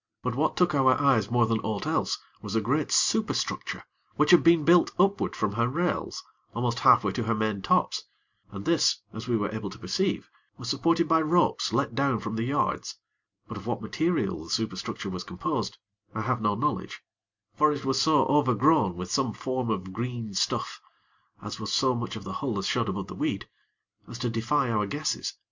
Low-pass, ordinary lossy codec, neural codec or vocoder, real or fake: 7.2 kHz; MP3, 64 kbps; none; real